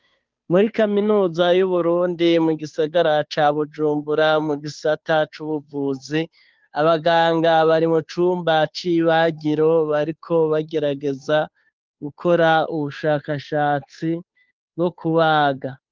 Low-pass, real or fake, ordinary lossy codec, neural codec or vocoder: 7.2 kHz; fake; Opus, 24 kbps; codec, 16 kHz, 2 kbps, FunCodec, trained on Chinese and English, 25 frames a second